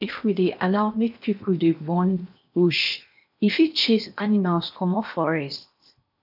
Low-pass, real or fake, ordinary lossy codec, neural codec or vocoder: 5.4 kHz; fake; none; codec, 16 kHz in and 24 kHz out, 0.8 kbps, FocalCodec, streaming, 65536 codes